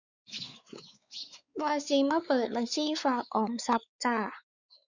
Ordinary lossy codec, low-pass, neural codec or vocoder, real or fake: Opus, 64 kbps; 7.2 kHz; codec, 16 kHz, 4 kbps, X-Codec, WavLM features, trained on Multilingual LibriSpeech; fake